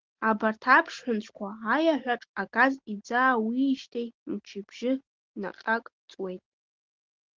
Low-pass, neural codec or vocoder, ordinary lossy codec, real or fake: 7.2 kHz; none; Opus, 16 kbps; real